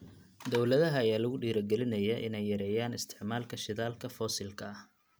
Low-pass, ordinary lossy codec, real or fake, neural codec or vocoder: none; none; real; none